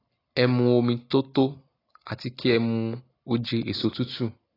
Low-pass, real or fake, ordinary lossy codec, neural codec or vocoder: 5.4 kHz; real; AAC, 24 kbps; none